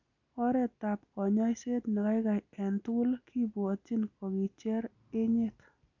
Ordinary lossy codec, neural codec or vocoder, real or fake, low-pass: none; none; real; 7.2 kHz